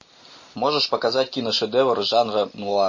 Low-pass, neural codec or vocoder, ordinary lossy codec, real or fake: 7.2 kHz; none; MP3, 32 kbps; real